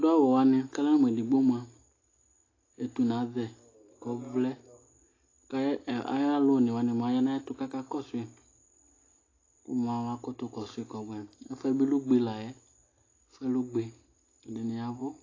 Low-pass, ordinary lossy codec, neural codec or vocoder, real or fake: 7.2 kHz; AAC, 32 kbps; none; real